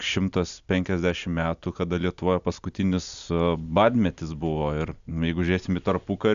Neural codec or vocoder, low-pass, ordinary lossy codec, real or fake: none; 7.2 kHz; AAC, 96 kbps; real